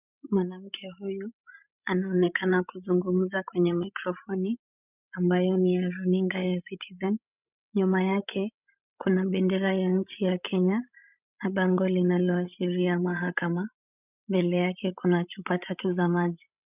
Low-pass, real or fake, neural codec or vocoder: 3.6 kHz; fake; codec, 16 kHz, 16 kbps, FreqCodec, larger model